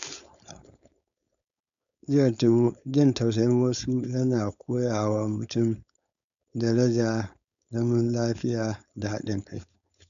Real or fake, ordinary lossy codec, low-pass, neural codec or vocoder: fake; none; 7.2 kHz; codec, 16 kHz, 4.8 kbps, FACodec